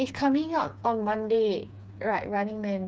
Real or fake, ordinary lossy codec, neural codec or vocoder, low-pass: fake; none; codec, 16 kHz, 4 kbps, FreqCodec, smaller model; none